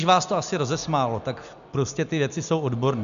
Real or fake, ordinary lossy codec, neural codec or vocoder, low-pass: real; MP3, 96 kbps; none; 7.2 kHz